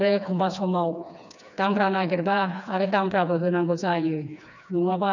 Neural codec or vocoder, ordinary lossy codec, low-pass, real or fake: codec, 16 kHz, 2 kbps, FreqCodec, smaller model; none; 7.2 kHz; fake